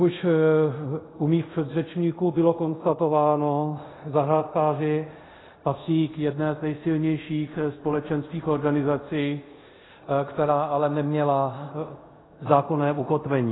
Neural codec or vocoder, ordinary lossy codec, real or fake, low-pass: codec, 24 kHz, 0.5 kbps, DualCodec; AAC, 16 kbps; fake; 7.2 kHz